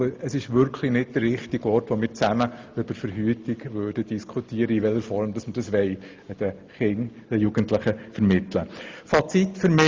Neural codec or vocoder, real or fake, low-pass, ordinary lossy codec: none; real; 7.2 kHz; Opus, 32 kbps